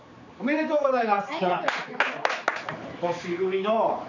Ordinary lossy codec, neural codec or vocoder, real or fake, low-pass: none; codec, 16 kHz, 4 kbps, X-Codec, HuBERT features, trained on general audio; fake; 7.2 kHz